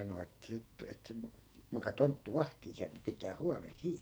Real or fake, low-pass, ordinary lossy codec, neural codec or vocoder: fake; none; none; codec, 44.1 kHz, 2.6 kbps, SNAC